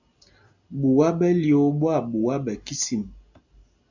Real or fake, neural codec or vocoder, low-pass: real; none; 7.2 kHz